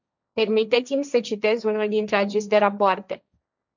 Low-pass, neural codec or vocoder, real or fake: 7.2 kHz; codec, 16 kHz, 1.1 kbps, Voila-Tokenizer; fake